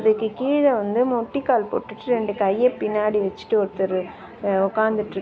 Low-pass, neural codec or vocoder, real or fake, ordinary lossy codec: none; none; real; none